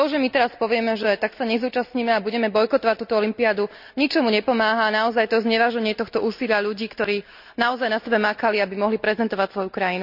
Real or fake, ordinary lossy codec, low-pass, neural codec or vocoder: real; none; 5.4 kHz; none